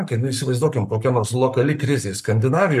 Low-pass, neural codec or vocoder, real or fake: 14.4 kHz; codec, 44.1 kHz, 7.8 kbps, Pupu-Codec; fake